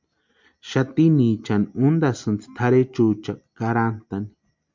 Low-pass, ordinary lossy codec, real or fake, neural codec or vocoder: 7.2 kHz; MP3, 64 kbps; real; none